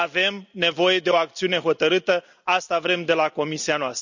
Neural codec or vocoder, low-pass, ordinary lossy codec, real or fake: none; 7.2 kHz; none; real